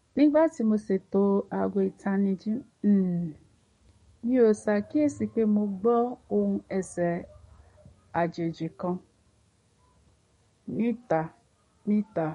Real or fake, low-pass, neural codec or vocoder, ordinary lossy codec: fake; 19.8 kHz; codec, 44.1 kHz, 7.8 kbps, DAC; MP3, 48 kbps